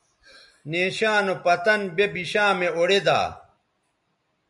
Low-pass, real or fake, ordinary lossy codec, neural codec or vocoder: 10.8 kHz; real; MP3, 96 kbps; none